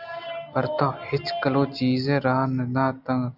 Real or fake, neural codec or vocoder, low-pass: real; none; 5.4 kHz